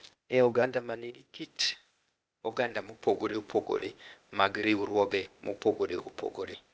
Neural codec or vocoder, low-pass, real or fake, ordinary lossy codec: codec, 16 kHz, 0.8 kbps, ZipCodec; none; fake; none